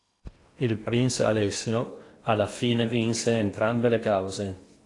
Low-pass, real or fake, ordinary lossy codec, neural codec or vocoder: 10.8 kHz; fake; AAC, 48 kbps; codec, 16 kHz in and 24 kHz out, 0.8 kbps, FocalCodec, streaming, 65536 codes